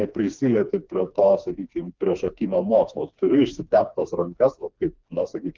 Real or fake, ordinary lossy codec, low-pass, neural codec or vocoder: fake; Opus, 32 kbps; 7.2 kHz; codec, 16 kHz, 2 kbps, FreqCodec, smaller model